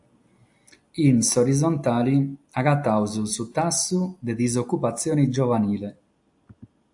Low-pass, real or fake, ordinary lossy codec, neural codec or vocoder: 10.8 kHz; real; AAC, 64 kbps; none